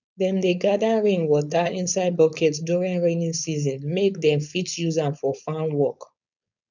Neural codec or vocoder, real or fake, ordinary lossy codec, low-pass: codec, 16 kHz, 4.8 kbps, FACodec; fake; none; 7.2 kHz